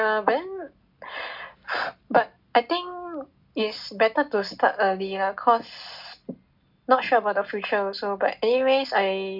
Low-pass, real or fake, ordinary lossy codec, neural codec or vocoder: 5.4 kHz; real; none; none